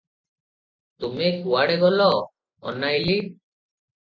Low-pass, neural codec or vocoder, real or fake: 7.2 kHz; none; real